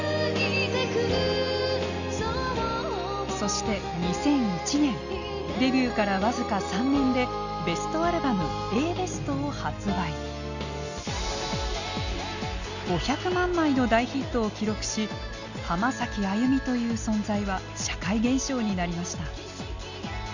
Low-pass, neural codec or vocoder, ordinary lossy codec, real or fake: 7.2 kHz; none; none; real